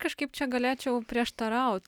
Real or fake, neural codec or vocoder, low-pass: real; none; 19.8 kHz